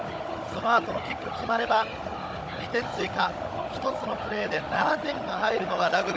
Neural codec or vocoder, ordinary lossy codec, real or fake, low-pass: codec, 16 kHz, 16 kbps, FunCodec, trained on Chinese and English, 50 frames a second; none; fake; none